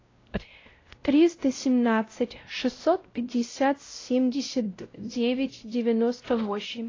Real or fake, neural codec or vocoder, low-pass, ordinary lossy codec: fake; codec, 16 kHz, 0.5 kbps, X-Codec, WavLM features, trained on Multilingual LibriSpeech; 7.2 kHz; AAC, 32 kbps